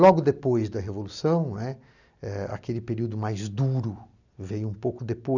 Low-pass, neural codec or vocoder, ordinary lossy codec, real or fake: 7.2 kHz; none; none; real